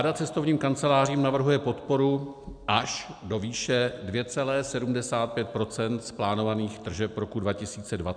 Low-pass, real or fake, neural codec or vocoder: 9.9 kHz; real; none